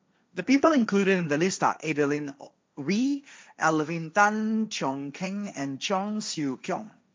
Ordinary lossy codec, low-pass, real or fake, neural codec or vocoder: none; none; fake; codec, 16 kHz, 1.1 kbps, Voila-Tokenizer